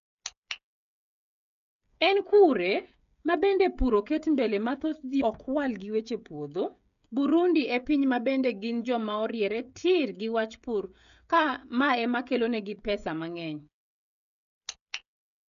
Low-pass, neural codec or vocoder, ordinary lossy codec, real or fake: 7.2 kHz; codec, 16 kHz, 16 kbps, FreqCodec, smaller model; none; fake